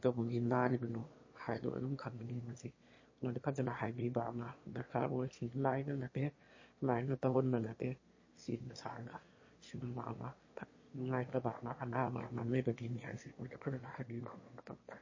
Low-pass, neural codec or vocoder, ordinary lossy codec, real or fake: 7.2 kHz; autoencoder, 22.05 kHz, a latent of 192 numbers a frame, VITS, trained on one speaker; MP3, 32 kbps; fake